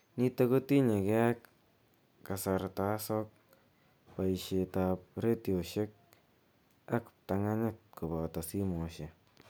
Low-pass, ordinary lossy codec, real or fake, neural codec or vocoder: none; none; real; none